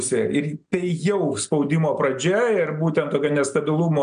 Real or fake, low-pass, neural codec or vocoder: real; 9.9 kHz; none